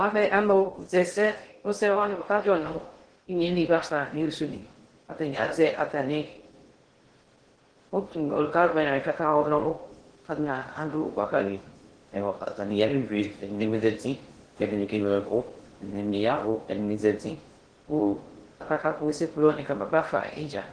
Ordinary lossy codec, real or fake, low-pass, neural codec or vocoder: Opus, 16 kbps; fake; 9.9 kHz; codec, 16 kHz in and 24 kHz out, 0.6 kbps, FocalCodec, streaming, 2048 codes